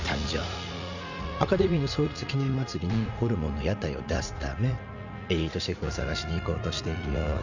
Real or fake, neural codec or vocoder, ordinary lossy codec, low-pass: fake; vocoder, 44.1 kHz, 80 mel bands, Vocos; none; 7.2 kHz